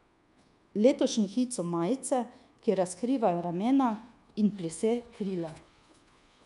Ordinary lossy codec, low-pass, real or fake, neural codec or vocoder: none; 10.8 kHz; fake; codec, 24 kHz, 1.2 kbps, DualCodec